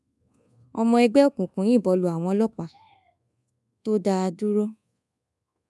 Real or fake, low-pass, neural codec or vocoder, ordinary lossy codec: fake; none; codec, 24 kHz, 1.2 kbps, DualCodec; none